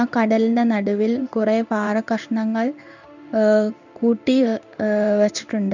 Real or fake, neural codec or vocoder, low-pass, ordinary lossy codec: fake; codec, 16 kHz in and 24 kHz out, 1 kbps, XY-Tokenizer; 7.2 kHz; none